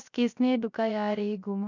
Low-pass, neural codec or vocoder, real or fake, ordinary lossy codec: 7.2 kHz; codec, 16 kHz, 0.3 kbps, FocalCodec; fake; none